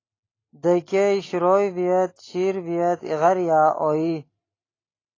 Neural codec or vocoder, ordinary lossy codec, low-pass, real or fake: none; AAC, 32 kbps; 7.2 kHz; real